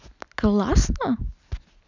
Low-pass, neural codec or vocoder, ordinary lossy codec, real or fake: 7.2 kHz; none; none; real